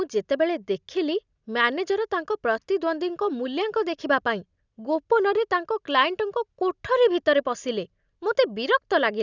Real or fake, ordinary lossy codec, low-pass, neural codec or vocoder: real; none; 7.2 kHz; none